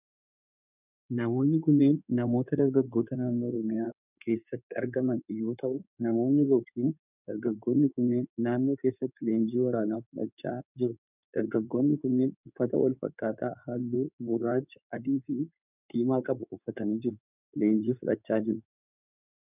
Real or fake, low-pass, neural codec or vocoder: fake; 3.6 kHz; codec, 16 kHz in and 24 kHz out, 2.2 kbps, FireRedTTS-2 codec